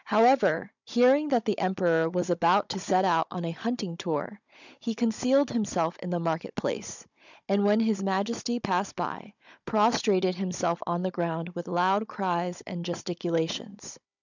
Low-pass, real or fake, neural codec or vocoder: 7.2 kHz; fake; codec, 16 kHz, 16 kbps, FunCodec, trained on Chinese and English, 50 frames a second